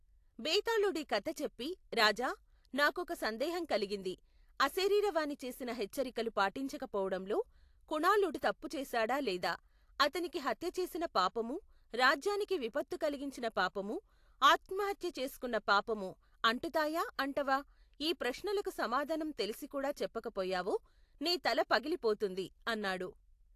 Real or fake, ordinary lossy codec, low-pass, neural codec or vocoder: real; AAC, 64 kbps; 14.4 kHz; none